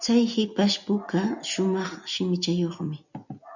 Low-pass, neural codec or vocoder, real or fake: 7.2 kHz; none; real